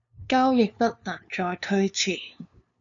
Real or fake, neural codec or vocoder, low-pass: fake; codec, 16 kHz, 2 kbps, FunCodec, trained on LibriTTS, 25 frames a second; 7.2 kHz